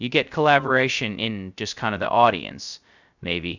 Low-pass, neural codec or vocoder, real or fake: 7.2 kHz; codec, 16 kHz, 0.2 kbps, FocalCodec; fake